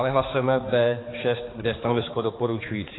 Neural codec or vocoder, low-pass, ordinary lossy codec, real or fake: codec, 16 kHz, 4 kbps, X-Codec, HuBERT features, trained on balanced general audio; 7.2 kHz; AAC, 16 kbps; fake